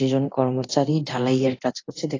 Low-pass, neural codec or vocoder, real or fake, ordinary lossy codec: 7.2 kHz; codec, 24 kHz, 0.9 kbps, DualCodec; fake; AAC, 32 kbps